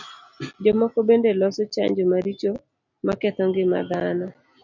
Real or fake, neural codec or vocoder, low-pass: real; none; 7.2 kHz